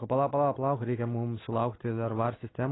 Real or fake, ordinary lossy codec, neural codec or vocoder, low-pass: real; AAC, 16 kbps; none; 7.2 kHz